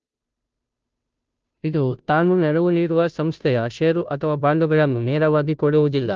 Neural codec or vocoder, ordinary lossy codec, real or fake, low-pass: codec, 16 kHz, 0.5 kbps, FunCodec, trained on Chinese and English, 25 frames a second; Opus, 24 kbps; fake; 7.2 kHz